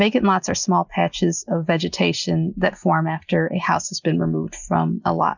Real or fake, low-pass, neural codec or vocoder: real; 7.2 kHz; none